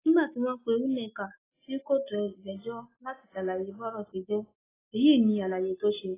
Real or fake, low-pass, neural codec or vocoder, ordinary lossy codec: real; 3.6 kHz; none; AAC, 16 kbps